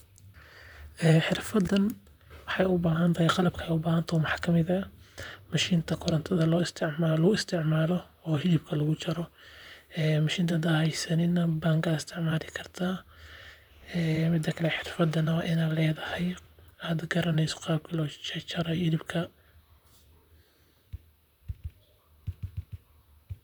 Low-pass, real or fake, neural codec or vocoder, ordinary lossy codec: 19.8 kHz; fake; vocoder, 44.1 kHz, 128 mel bands, Pupu-Vocoder; none